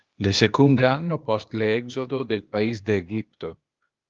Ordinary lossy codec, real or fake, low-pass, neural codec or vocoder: Opus, 32 kbps; fake; 7.2 kHz; codec, 16 kHz, 0.8 kbps, ZipCodec